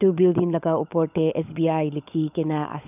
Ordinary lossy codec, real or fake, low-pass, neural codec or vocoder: none; fake; 3.6 kHz; codec, 16 kHz, 16 kbps, FunCodec, trained on LibriTTS, 50 frames a second